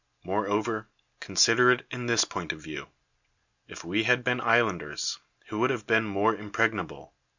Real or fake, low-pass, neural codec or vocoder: real; 7.2 kHz; none